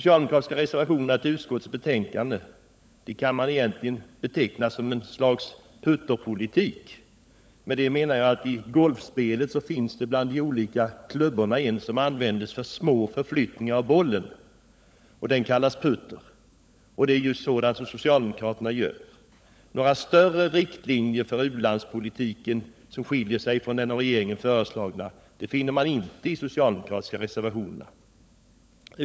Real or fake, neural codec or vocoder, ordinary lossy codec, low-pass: fake; codec, 16 kHz, 16 kbps, FunCodec, trained on LibriTTS, 50 frames a second; none; none